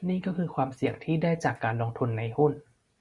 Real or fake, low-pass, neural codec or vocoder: real; 10.8 kHz; none